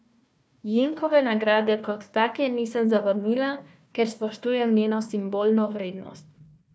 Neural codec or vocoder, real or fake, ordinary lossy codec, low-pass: codec, 16 kHz, 1 kbps, FunCodec, trained on Chinese and English, 50 frames a second; fake; none; none